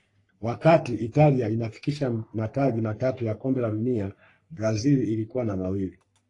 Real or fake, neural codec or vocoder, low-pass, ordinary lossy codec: fake; codec, 44.1 kHz, 3.4 kbps, Pupu-Codec; 10.8 kHz; AAC, 48 kbps